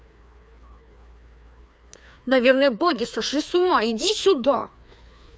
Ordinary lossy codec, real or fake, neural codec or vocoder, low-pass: none; fake; codec, 16 kHz, 2 kbps, FreqCodec, larger model; none